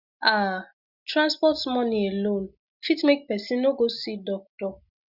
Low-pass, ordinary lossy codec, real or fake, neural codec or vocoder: 5.4 kHz; Opus, 64 kbps; real; none